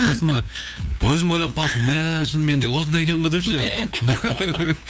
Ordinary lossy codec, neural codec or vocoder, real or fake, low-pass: none; codec, 16 kHz, 2 kbps, FunCodec, trained on LibriTTS, 25 frames a second; fake; none